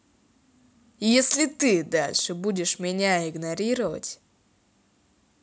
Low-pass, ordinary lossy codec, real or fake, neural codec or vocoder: none; none; real; none